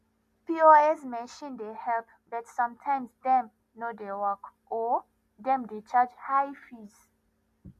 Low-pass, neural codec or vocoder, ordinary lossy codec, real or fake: 14.4 kHz; none; MP3, 96 kbps; real